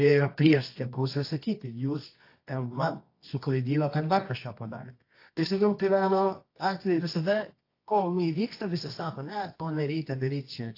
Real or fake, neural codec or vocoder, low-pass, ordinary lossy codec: fake; codec, 24 kHz, 0.9 kbps, WavTokenizer, medium music audio release; 5.4 kHz; AAC, 32 kbps